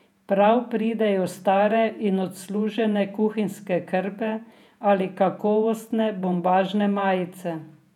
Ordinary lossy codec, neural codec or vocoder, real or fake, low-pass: none; vocoder, 48 kHz, 128 mel bands, Vocos; fake; 19.8 kHz